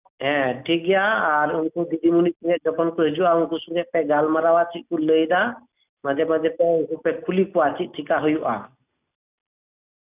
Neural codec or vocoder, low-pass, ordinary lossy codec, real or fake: none; 3.6 kHz; none; real